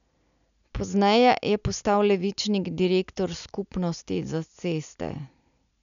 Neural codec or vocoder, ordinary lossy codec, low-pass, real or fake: none; none; 7.2 kHz; real